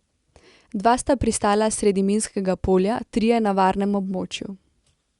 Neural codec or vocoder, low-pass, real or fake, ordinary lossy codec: none; 10.8 kHz; real; Opus, 64 kbps